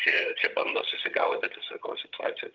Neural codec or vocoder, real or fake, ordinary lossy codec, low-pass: vocoder, 22.05 kHz, 80 mel bands, HiFi-GAN; fake; Opus, 32 kbps; 7.2 kHz